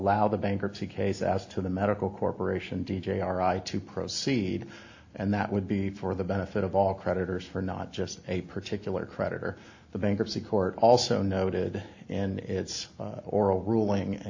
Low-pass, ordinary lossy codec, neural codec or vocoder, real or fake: 7.2 kHz; AAC, 48 kbps; none; real